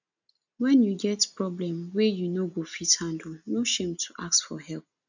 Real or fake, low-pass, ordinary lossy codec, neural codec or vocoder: real; 7.2 kHz; none; none